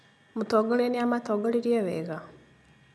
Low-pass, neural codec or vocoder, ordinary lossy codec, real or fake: none; none; none; real